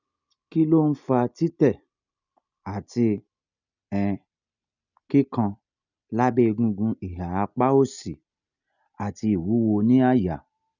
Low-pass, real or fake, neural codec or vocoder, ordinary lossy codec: 7.2 kHz; real; none; none